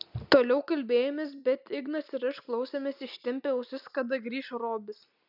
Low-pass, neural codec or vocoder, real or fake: 5.4 kHz; none; real